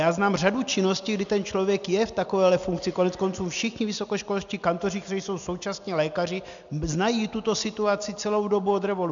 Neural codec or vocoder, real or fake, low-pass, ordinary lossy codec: none; real; 7.2 kHz; MP3, 96 kbps